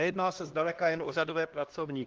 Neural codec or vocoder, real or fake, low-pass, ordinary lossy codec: codec, 16 kHz, 1 kbps, X-Codec, HuBERT features, trained on LibriSpeech; fake; 7.2 kHz; Opus, 16 kbps